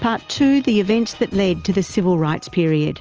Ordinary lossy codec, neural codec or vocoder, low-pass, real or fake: Opus, 24 kbps; none; 7.2 kHz; real